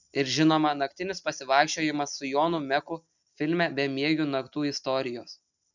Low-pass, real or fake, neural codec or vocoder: 7.2 kHz; real; none